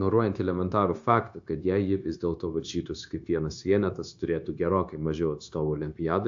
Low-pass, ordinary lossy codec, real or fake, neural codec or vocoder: 7.2 kHz; AAC, 64 kbps; fake; codec, 16 kHz, 0.9 kbps, LongCat-Audio-Codec